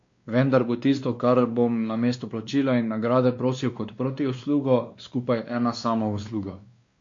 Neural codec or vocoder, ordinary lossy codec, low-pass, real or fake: codec, 16 kHz, 2 kbps, X-Codec, WavLM features, trained on Multilingual LibriSpeech; MP3, 48 kbps; 7.2 kHz; fake